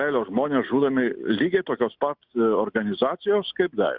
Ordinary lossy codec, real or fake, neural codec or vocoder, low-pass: Opus, 64 kbps; real; none; 5.4 kHz